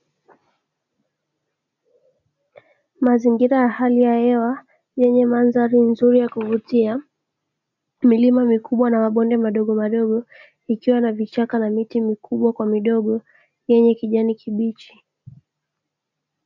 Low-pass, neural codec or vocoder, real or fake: 7.2 kHz; none; real